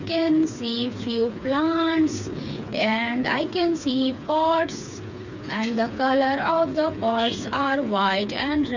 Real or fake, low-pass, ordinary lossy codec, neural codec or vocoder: fake; 7.2 kHz; none; codec, 16 kHz, 4 kbps, FreqCodec, smaller model